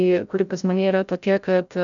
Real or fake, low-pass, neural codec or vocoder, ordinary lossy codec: fake; 7.2 kHz; codec, 16 kHz, 0.5 kbps, FreqCodec, larger model; MP3, 96 kbps